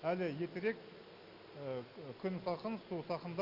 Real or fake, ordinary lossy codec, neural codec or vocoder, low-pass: real; Opus, 64 kbps; none; 5.4 kHz